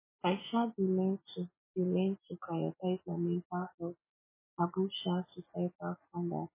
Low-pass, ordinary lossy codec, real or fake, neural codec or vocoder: 3.6 kHz; MP3, 16 kbps; real; none